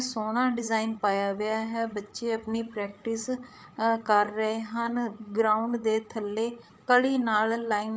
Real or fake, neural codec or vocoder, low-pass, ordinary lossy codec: fake; codec, 16 kHz, 8 kbps, FreqCodec, larger model; none; none